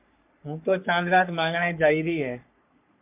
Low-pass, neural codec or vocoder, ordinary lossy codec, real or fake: 3.6 kHz; codec, 44.1 kHz, 3.4 kbps, Pupu-Codec; MP3, 32 kbps; fake